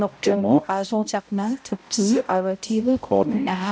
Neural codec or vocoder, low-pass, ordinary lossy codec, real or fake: codec, 16 kHz, 0.5 kbps, X-Codec, HuBERT features, trained on balanced general audio; none; none; fake